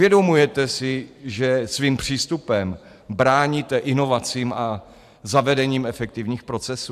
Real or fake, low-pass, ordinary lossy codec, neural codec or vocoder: fake; 14.4 kHz; AAC, 96 kbps; vocoder, 44.1 kHz, 128 mel bands every 512 samples, BigVGAN v2